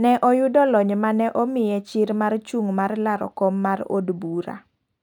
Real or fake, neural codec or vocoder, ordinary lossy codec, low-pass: real; none; none; 19.8 kHz